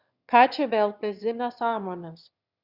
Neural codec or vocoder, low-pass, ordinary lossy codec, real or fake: autoencoder, 22.05 kHz, a latent of 192 numbers a frame, VITS, trained on one speaker; 5.4 kHz; Opus, 64 kbps; fake